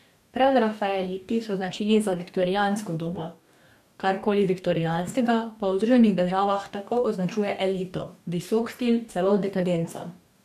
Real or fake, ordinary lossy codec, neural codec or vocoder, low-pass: fake; none; codec, 44.1 kHz, 2.6 kbps, DAC; 14.4 kHz